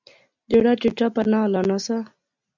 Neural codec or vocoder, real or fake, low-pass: none; real; 7.2 kHz